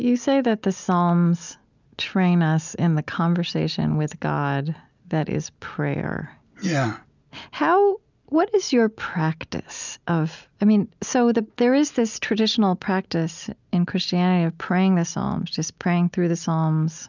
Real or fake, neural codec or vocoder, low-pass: real; none; 7.2 kHz